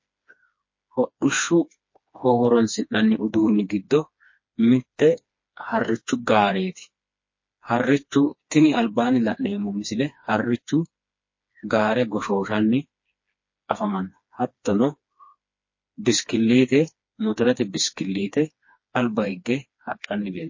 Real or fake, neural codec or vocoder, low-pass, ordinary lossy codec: fake; codec, 16 kHz, 2 kbps, FreqCodec, smaller model; 7.2 kHz; MP3, 32 kbps